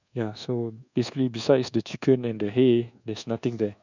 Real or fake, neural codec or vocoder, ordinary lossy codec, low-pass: fake; codec, 24 kHz, 1.2 kbps, DualCodec; none; 7.2 kHz